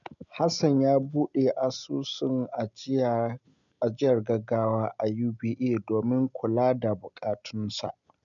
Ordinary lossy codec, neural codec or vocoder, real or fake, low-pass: MP3, 96 kbps; none; real; 7.2 kHz